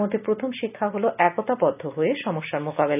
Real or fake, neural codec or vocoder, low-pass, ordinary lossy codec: real; none; 3.6 kHz; none